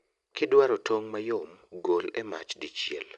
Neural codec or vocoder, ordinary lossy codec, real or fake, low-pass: none; none; real; 10.8 kHz